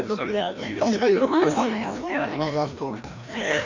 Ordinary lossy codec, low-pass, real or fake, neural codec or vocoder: MP3, 64 kbps; 7.2 kHz; fake; codec, 16 kHz, 1 kbps, FreqCodec, larger model